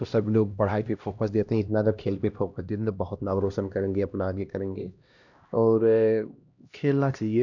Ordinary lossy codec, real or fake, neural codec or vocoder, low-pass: none; fake; codec, 16 kHz, 1 kbps, X-Codec, HuBERT features, trained on LibriSpeech; 7.2 kHz